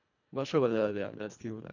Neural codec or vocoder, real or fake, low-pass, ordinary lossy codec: codec, 24 kHz, 1.5 kbps, HILCodec; fake; 7.2 kHz; none